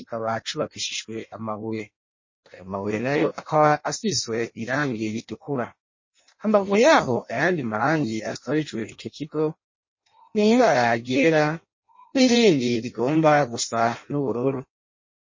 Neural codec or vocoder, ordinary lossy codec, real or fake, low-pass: codec, 16 kHz in and 24 kHz out, 0.6 kbps, FireRedTTS-2 codec; MP3, 32 kbps; fake; 7.2 kHz